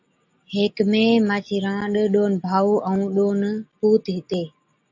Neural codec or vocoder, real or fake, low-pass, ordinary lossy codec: none; real; 7.2 kHz; AAC, 48 kbps